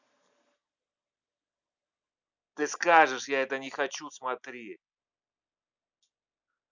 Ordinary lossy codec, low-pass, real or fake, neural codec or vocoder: none; 7.2 kHz; real; none